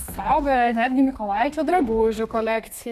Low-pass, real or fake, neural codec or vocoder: 14.4 kHz; fake; codec, 32 kHz, 1.9 kbps, SNAC